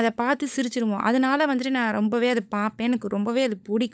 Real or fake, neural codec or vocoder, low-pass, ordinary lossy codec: fake; codec, 16 kHz, 16 kbps, FunCodec, trained on LibriTTS, 50 frames a second; none; none